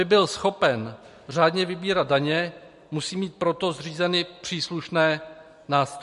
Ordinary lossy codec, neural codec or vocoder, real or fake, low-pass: MP3, 48 kbps; none; real; 14.4 kHz